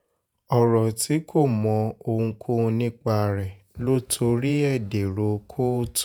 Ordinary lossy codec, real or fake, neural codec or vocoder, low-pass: none; fake; vocoder, 48 kHz, 128 mel bands, Vocos; none